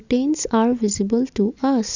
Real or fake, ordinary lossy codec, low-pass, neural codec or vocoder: real; none; 7.2 kHz; none